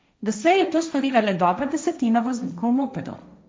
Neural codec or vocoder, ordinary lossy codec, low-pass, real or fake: codec, 16 kHz, 1.1 kbps, Voila-Tokenizer; none; none; fake